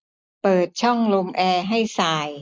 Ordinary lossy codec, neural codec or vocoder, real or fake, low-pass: none; none; real; none